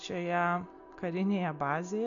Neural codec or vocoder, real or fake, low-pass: none; real; 7.2 kHz